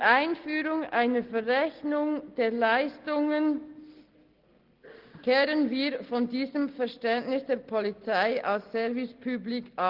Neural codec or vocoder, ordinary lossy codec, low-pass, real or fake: none; Opus, 16 kbps; 5.4 kHz; real